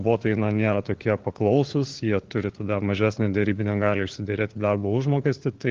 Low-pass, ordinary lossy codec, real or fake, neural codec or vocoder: 7.2 kHz; Opus, 16 kbps; fake; codec, 16 kHz, 4 kbps, FunCodec, trained on LibriTTS, 50 frames a second